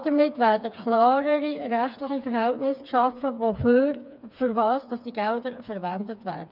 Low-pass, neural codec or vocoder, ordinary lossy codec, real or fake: 5.4 kHz; codec, 16 kHz, 4 kbps, FreqCodec, smaller model; none; fake